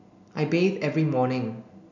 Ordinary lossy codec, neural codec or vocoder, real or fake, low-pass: none; none; real; 7.2 kHz